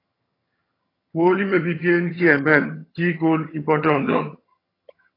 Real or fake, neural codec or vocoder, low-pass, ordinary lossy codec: fake; vocoder, 22.05 kHz, 80 mel bands, HiFi-GAN; 5.4 kHz; AAC, 24 kbps